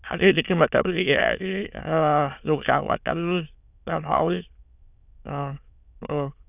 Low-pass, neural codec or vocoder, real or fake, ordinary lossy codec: 3.6 kHz; autoencoder, 22.05 kHz, a latent of 192 numbers a frame, VITS, trained on many speakers; fake; none